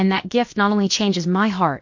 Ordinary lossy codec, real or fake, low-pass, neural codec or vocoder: MP3, 48 kbps; fake; 7.2 kHz; codec, 16 kHz, about 1 kbps, DyCAST, with the encoder's durations